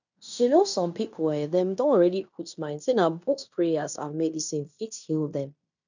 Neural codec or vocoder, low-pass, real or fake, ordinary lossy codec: codec, 16 kHz in and 24 kHz out, 0.9 kbps, LongCat-Audio-Codec, fine tuned four codebook decoder; 7.2 kHz; fake; none